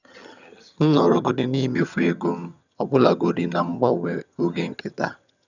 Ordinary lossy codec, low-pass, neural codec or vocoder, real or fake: none; 7.2 kHz; vocoder, 22.05 kHz, 80 mel bands, HiFi-GAN; fake